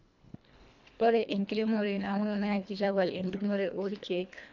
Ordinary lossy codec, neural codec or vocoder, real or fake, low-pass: none; codec, 24 kHz, 1.5 kbps, HILCodec; fake; 7.2 kHz